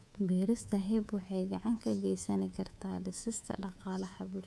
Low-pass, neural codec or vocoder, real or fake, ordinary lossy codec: none; codec, 24 kHz, 3.1 kbps, DualCodec; fake; none